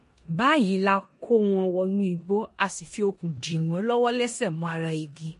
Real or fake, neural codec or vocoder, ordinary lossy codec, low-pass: fake; codec, 16 kHz in and 24 kHz out, 0.9 kbps, LongCat-Audio-Codec, four codebook decoder; MP3, 48 kbps; 10.8 kHz